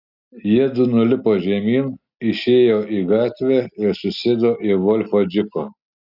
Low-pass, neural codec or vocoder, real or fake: 5.4 kHz; none; real